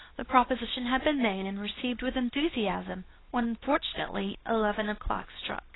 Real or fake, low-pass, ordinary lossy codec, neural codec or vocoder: fake; 7.2 kHz; AAC, 16 kbps; codec, 24 kHz, 0.9 kbps, WavTokenizer, medium speech release version 2